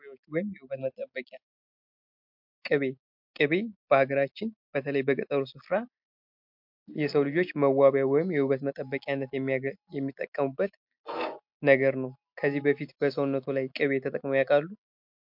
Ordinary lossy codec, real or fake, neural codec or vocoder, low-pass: MP3, 48 kbps; real; none; 5.4 kHz